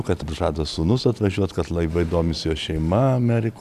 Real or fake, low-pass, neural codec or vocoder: real; 14.4 kHz; none